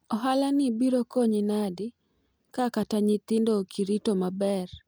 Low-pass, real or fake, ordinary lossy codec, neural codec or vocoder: none; real; none; none